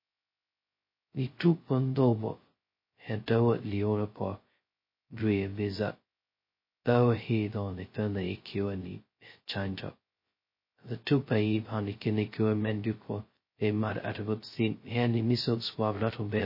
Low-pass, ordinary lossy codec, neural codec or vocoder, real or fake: 5.4 kHz; MP3, 24 kbps; codec, 16 kHz, 0.2 kbps, FocalCodec; fake